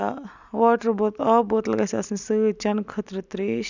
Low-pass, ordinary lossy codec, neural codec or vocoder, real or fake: 7.2 kHz; none; none; real